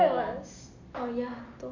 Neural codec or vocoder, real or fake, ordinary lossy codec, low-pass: none; real; AAC, 48 kbps; 7.2 kHz